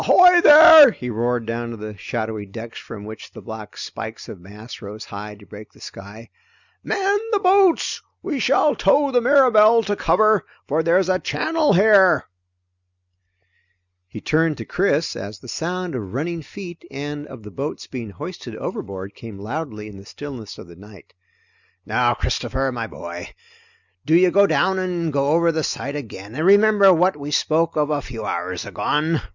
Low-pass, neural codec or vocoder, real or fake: 7.2 kHz; none; real